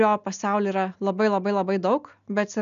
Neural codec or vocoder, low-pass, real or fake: none; 7.2 kHz; real